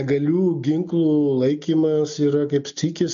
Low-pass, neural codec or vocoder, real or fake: 7.2 kHz; none; real